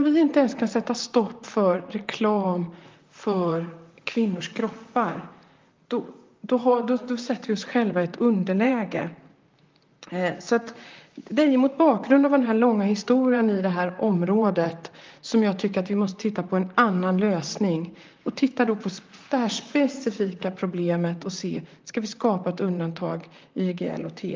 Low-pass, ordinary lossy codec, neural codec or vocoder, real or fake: 7.2 kHz; Opus, 24 kbps; vocoder, 44.1 kHz, 128 mel bands, Pupu-Vocoder; fake